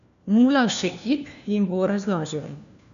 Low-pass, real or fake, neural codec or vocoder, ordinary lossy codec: 7.2 kHz; fake; codec, 16 kHz, 1 kbps, FunCodec, trained on LibriTTS, 50 frames a second; none